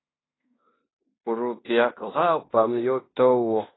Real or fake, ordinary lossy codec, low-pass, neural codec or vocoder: fake; AAC, 16 kbps; 7.2 kHz; codec, 16 kHz in and 24 kHz out, 0.9 kbps, LongCat-Audio-Codec, fine tuned four codebook decoder